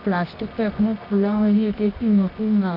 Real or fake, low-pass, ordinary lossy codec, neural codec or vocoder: fake; 5.4 kHz; none; codec, 24 kHz, 0.9 kbps, WavTokenizer, medium music audio release